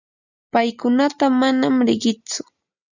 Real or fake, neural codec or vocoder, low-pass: real; none; 7.2 kHz